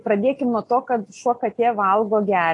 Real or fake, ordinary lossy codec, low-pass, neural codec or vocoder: real; AAC, 48 kbps; 10.8 kHz; none